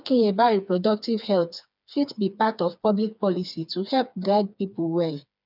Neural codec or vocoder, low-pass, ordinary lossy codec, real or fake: codec, 16 kHz, 4 kbps, FreqCodec, smaller model; 5.4 kHz; none; fake